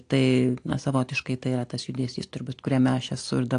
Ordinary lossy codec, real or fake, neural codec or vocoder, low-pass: AAC, 48 kbps; real; none; 9.9 kHz